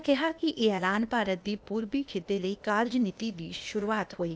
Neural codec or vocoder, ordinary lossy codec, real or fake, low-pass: codec, 16 kHz, 0.8 kbps, ZipCodec; none; fake; none